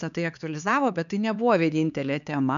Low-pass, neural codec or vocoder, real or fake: 7.2 kHz; codec, 16 kHz, 4 kbps, X-Codec, HuBERT features, trained on LibriSpeech; fake